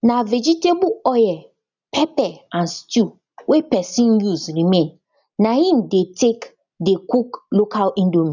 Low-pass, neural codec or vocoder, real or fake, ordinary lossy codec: 7.2 kHz; none; real; none